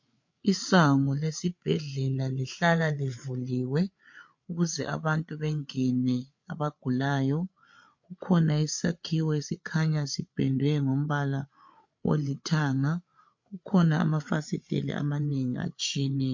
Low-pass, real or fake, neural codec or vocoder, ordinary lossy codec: 7.2 kHz; fake; codec, 16 kHz, 8 kbps, FreqCodec, larger model; MP3, 48 kbps